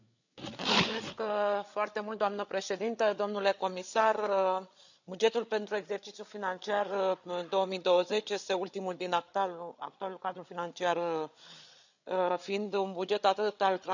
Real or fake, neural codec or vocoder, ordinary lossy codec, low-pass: fake; codec, 16 kHz, 8 kbps, FreqCodec, larger model; none; 7.2 kHz